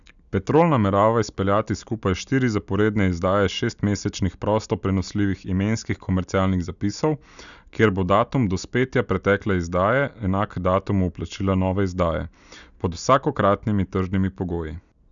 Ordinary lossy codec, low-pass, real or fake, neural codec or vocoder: none; 7.2 kHz; real; none